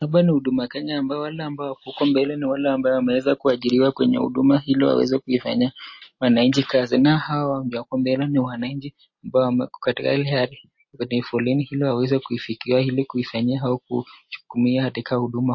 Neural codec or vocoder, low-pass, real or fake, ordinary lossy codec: none; 7.2 kHz; real; MP3, 32 kbps